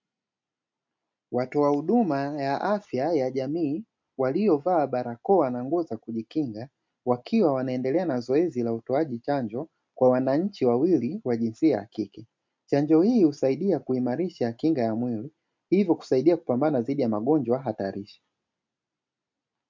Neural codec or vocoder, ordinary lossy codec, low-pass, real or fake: none; MP3, 64 kbps; 7.2 kHz; real